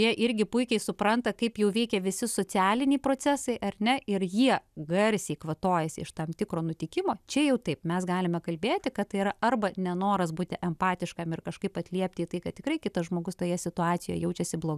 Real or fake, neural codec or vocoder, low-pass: real; none; 14.4 kHz